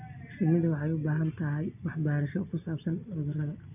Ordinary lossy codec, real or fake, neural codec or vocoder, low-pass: none; real; none; 3.6 kHz